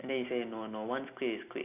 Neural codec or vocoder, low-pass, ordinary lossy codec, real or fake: none; 3.6 kHz; none; real